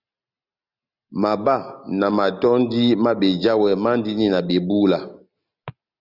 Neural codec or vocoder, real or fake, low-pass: none; real; 5.4 kHz